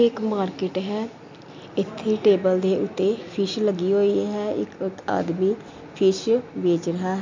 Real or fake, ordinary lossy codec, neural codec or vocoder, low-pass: real; MP3, 64 kbps; none; 7.2 kHz